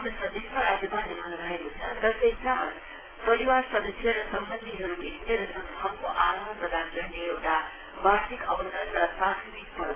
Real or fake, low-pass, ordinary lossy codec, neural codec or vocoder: fake; 3.6 kHz; AAC, 16 kbps; vocoder, 22.05 kHz, 80 mel bands, WaveNeXt